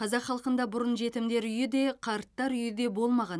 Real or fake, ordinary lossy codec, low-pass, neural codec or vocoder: real; none; none; none